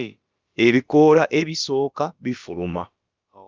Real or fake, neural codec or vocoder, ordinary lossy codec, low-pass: fake; codec, 16 kHz, about 1 kbps, DyCAST, with the encoder's durations; Opus, 32 kbps; 7.2 kHz